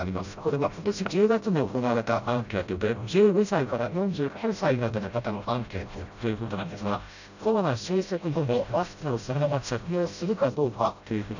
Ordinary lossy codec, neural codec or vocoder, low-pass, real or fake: none; codec, 16 kHz, 0.5 kbps, FreqCodec, smaller model; 7.2 kHz; fake